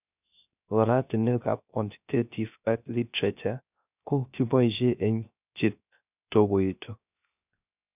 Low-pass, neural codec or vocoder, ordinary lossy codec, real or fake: 3.6 kHz; codec, 16 kHz, 0.3 kbps, FocalCodec; none; fake